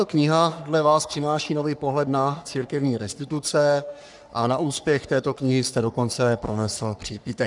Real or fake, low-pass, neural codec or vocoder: fake; 10.8 kHz; codec, 44.1 kHz, 3.4 kbps, Pupu-Codec